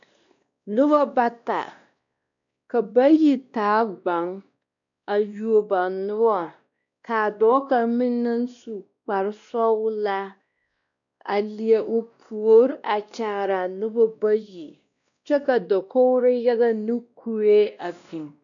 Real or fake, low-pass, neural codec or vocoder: fake; 7.2 kHz; codec, 16 kHz, 1 kbps, X-Codec, WavLM features, trained on Multilingual LibriSpeech